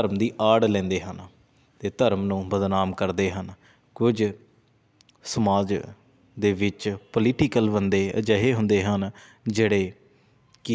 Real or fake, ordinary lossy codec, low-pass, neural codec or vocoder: real; none; none; none